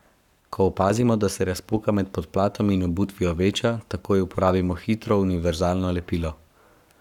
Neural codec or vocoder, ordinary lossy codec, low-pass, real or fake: codec, 44.1 kHz, 7.8 kbps, Pupu-Codec; none; 19.8 kHz; fake